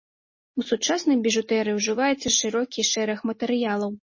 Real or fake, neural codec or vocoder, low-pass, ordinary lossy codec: real; none; 7.2 kHz; MP3, 32 kbps